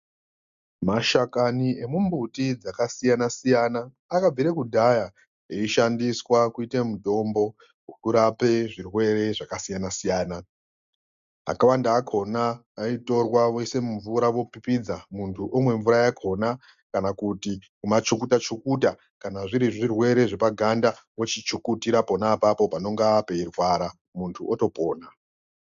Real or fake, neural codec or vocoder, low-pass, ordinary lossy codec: real; none; 7.2 kHz; MP3, 64 kbps